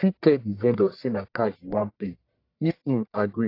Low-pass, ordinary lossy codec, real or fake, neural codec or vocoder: 5.4 kHz; AAC, 32 kbps; fake; codec, 44.1 kHz, 1.7 kbps, Pupu-Codec